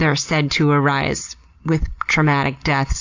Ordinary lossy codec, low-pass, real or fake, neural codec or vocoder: AAC, 48 kbps; 7.2 kHz; real; none